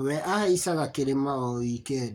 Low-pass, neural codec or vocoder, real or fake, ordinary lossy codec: 19.8 kHz; codec, 44.1 kHz, 7.8 kbps, Pupu-Codec; fake; none